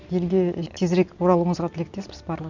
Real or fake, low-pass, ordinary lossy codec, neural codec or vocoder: real; 7.2 kHz; none; none